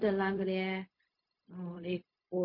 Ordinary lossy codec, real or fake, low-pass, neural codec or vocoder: MP3, 32 kbps; fake; 5.4 kHz; codec, 16 kHz, 0.4 kbps, LongCat-Audio-Codec